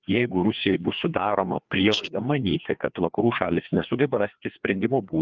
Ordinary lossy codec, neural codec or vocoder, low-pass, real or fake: Opus, 32 kbps; codec, 16 kHz, 2 kbps, FreqCodec, larger model; 7.2 kHz; fake